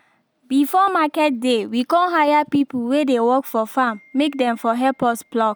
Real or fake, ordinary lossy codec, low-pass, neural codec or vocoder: real; none; none; none